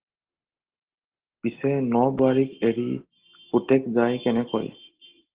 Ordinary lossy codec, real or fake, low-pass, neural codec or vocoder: Opus, 16 kbps; real; 3.6 kHz; none